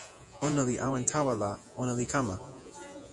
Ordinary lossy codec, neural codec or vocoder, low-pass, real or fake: MP3, 64 kbps; vocoder, 48 kHz, 128 mel bands, Vocos; 10.8 kHz; fake